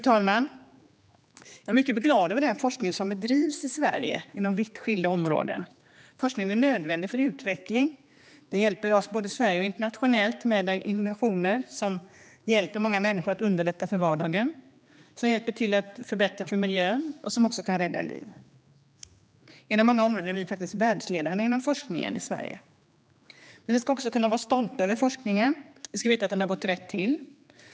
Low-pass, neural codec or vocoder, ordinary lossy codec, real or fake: none; codec, 16 kHz, 2 kbps, X-Codec, HuBERT features, trained on general audio; none; fake